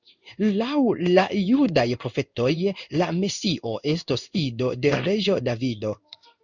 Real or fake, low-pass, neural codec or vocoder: fake; 7.2 kHz; codec, 16 kHz in and 24 kHz out, 1 kbps, XY-Tokenizer